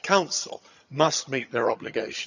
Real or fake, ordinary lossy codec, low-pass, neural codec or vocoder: fake; none; 7.2 kHz; vocoder, 22.05 kHz, 80 mel bands, HiFi-GAN